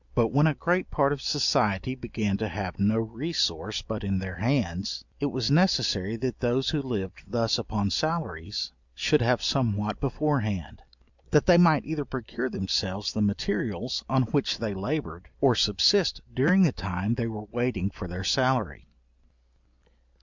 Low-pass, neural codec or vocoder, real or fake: 7.2 kHz; none; real